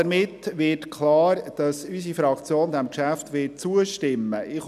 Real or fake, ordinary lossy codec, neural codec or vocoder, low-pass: real; none; none; 14.4 kHz